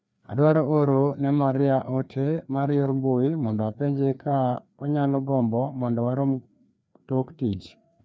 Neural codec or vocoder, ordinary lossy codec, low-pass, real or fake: codec, 16 kHz, 2 kbps, FreqCodec, larger model; none; none; fake